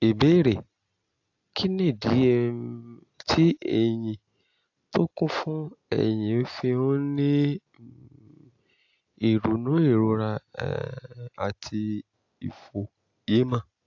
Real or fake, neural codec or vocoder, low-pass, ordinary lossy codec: real; none; 7.2 kHz; AAC, 48 kbps